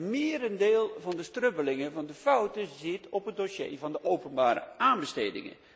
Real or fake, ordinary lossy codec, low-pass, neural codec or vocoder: real; none; none; none